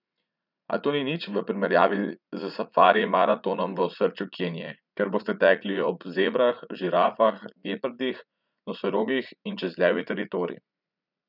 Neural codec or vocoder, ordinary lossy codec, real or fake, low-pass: vocoder, 44.1 kHz, 80 mel bands, Vocos; none; fake; 5.4 kHz